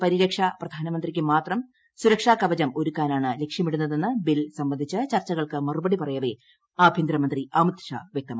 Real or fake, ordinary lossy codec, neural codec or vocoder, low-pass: real; none; none; none